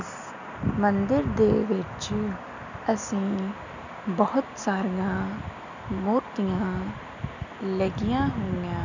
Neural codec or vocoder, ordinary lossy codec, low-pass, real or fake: none; none; 7.2 kHz; real